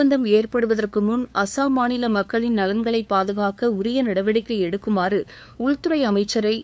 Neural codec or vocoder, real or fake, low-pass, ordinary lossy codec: codec, 16 kHz, 2 kbps, FunCodec, trained on LibriTTS, 25 frames a second; fake; none; none